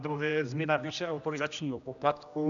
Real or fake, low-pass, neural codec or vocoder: fake; 7.2 kHz; codec, 16 kHz, 1 kbps, X-Codec, HuBERT features, trained on general audio